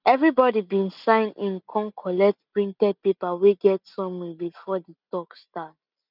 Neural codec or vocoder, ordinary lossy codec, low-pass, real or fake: none; none; 5.4 kHz; real